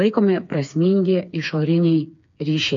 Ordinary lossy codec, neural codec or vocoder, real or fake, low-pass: AAC, 64 kbps; codec, 16 kHz, 4 kbps, FreqCodec, smaller model; fake; 7.2 kHz